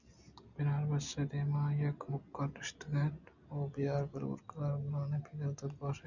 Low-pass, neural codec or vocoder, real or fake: 7.2 kHz; none; real